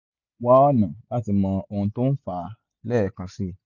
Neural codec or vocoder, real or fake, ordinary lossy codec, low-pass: none; real; none; 7.2 kHz